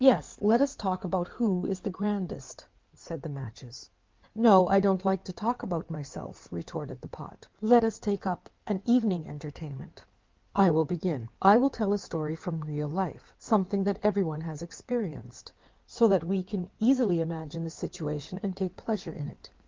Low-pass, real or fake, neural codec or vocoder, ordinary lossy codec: 7.2 kHz; fake; codec, 16 kHz in and 24 kHz out, 2.2 kbps, FireRedTTS-2 codec; Opus, 32 kbps